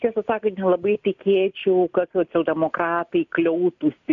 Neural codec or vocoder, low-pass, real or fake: none; 7.2 kHz; real